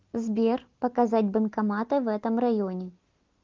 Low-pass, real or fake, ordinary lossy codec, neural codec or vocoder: 7.2 kHz; real; Opus, 32 kbps; none